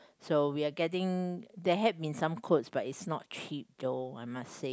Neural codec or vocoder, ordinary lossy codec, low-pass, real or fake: none; none; none; real